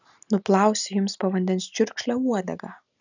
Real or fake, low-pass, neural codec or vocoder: real; 7.2 kHz; none